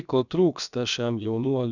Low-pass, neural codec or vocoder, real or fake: 7.2 kHz; codec, 16 kHz, about 1 kbps, DyCAST, with the encoder's durations; fake